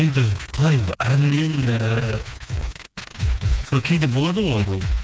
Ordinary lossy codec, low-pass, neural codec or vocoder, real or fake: none; none; codec, 16 kHz, 2 kbps, FreqCodec, smaller model; fake